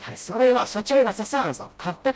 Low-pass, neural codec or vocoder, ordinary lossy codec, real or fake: none; codec, 16 kHz, 0.5 kbps, FreqCodec, smaller model; none; fake